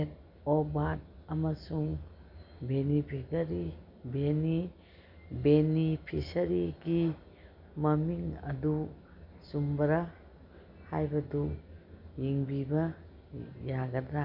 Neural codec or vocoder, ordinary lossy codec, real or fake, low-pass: none; none; real; 5.4 kHz